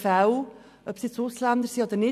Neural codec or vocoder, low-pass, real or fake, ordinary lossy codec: none; 14.4 kHz; real; none